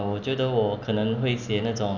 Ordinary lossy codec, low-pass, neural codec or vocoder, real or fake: none; 7.2 kHz; none; real